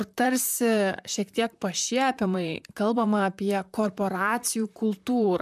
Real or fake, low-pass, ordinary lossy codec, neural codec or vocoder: fake; 14.4 kHz; MP3, 96 kbps; vocoder, 44.1 kHz, 128 mel bands, Pupu-Vocoder